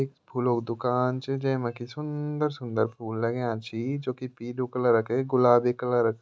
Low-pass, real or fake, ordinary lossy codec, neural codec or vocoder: none; real; none; none